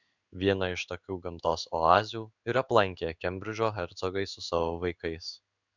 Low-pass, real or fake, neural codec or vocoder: 7.2 kHz; fake; codec, 16 kHz in and 24 kHz out, 1 kbps, XY-Tokenizer